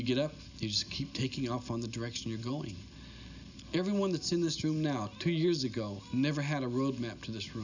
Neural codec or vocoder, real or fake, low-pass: none; real; 7.2 kHz